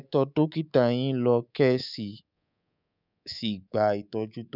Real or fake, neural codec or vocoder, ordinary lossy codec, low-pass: fake; codec, 24 kHz, 3.1 kbps, DualCodec; none; 5.4 kHz